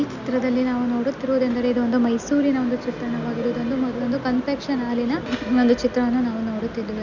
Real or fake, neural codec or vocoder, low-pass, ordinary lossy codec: real; none; 7.2 kHz; none